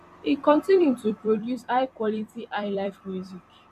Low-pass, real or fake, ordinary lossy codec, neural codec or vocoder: 14.4 kHz; fake; MP3, 96 kbps; vocoder, 44.1 kHz, 128 mel bands every 256 samples, BigVGAN v2